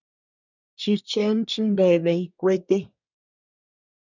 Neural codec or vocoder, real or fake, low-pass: codec, 24 kHz, 1 kbps, SNAC; fake; 7.2 kHz